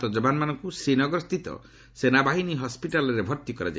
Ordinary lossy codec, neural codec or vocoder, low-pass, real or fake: none; none; none; real